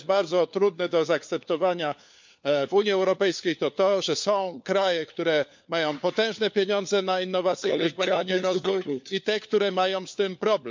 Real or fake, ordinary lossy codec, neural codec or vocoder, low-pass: fake; MP3, 64 kbps; codec, 16 kHz, 4 kbps, FunCodec, trained on LibriTTS, 50 frames a second; 7.2 kHz